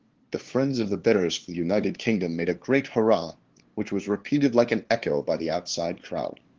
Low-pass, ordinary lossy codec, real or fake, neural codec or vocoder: 7.2 kHz; Opus, 16 kbps; fake; codec, 16 kHz, 2 kbps, FunCodec, trained on LibriTTS, 25 frames a second